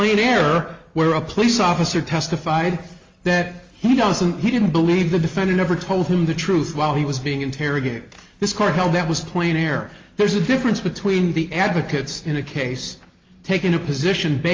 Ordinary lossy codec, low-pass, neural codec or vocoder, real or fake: Opus, 32 kbps; 7.2 kHz; none; real